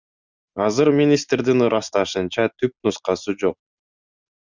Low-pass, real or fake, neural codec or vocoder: 7.2 kHz; real; none